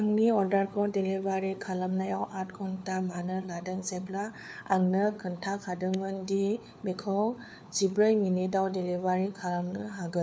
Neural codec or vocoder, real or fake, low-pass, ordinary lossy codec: codec, 16 kHz, 4 kbps, FunCodec, trained on LibriTTS, 50 frames a second; fake; none; none